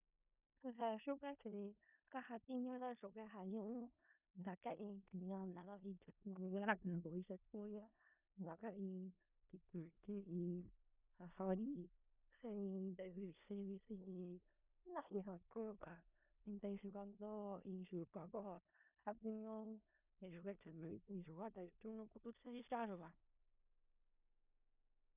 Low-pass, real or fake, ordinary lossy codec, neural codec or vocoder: 3.6 kHz; fake; none; codec, 16 kHz in and 24 kHz out, 0.4 kbps, LongCat-Audio-Codec, four codebook decoder